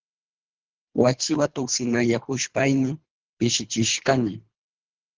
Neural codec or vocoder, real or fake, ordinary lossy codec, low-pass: codec, 24 kHz, 3 kbps, HILCodec; fake; Opus, 16 kbps; 7.2 kHz